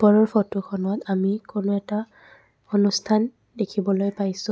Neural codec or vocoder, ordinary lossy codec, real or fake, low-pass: none; none; real; none